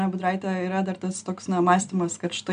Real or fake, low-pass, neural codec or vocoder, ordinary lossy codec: real; 9.9 kHz; none; MP3, 96 kbps